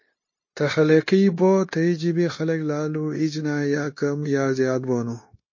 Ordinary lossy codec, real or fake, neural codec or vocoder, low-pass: MP3, 32 kbps; fake; codec, 16 kHz, 0.9 kbps, LongCat-Audio-Codec; 7.2 kHz